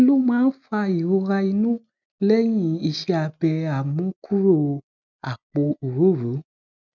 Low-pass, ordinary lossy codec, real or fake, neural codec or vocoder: 7.2 kHz; none; real; none